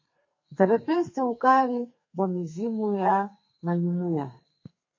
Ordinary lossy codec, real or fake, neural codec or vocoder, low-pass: MP3, 32 kbps; fake; codec, 32 kHz, 1.9 kbps, SNAC; 7.2 kHz